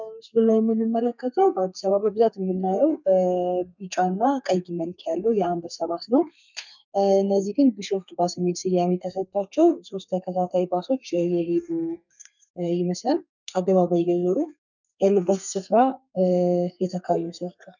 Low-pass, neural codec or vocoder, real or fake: 7.2 kHz; codec, 32 kHz, 1.9 kbps, SNAC; fake